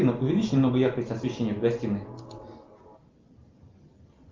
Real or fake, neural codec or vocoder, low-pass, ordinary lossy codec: real; none; 7.2 kHz; Opus, 32 kbps